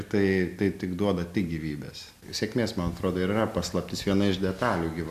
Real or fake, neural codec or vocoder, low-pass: real; none; 14.4 kHz